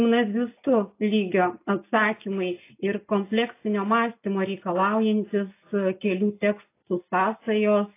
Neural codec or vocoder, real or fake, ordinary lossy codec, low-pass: none; real; AAC, 24 kbps; 3.6 kHz